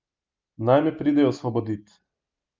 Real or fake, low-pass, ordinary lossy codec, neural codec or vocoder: real; 7.2 kHz; Opus, 32 kbps; none